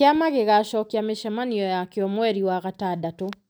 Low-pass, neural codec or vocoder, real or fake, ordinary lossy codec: none; none; real; none